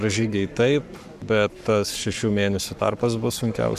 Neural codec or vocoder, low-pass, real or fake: codec, 44.1 kHz, 7.8 kbps, Pupu-Codec; 14.4 kHz; fake